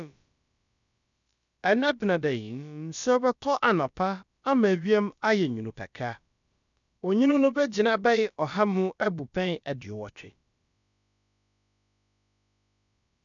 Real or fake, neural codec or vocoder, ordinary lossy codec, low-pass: fake; codec, 16 kHz, about 1 kbps, DyCAST, with the encoder's durations; none; 7.2 kHz